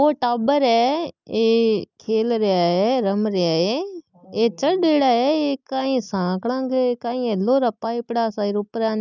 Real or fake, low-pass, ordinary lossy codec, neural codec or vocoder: real; 7.2 kHz; none; none